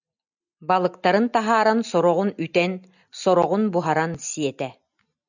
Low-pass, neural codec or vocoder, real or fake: 7.2 kHz; none; real